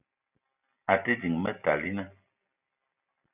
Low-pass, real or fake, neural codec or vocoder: 3.6 kHz; real; none